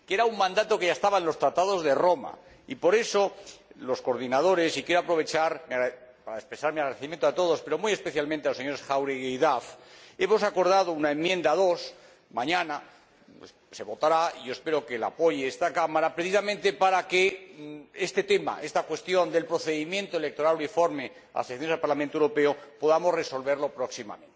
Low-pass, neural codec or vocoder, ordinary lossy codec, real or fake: none; none; none; real